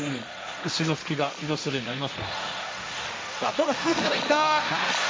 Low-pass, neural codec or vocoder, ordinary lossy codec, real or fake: none; codec, 16 kHz, 1.1 kbps, Voila-Tokenizer; none; fake